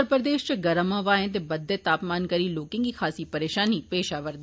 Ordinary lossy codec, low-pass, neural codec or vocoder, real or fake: none; none; none; real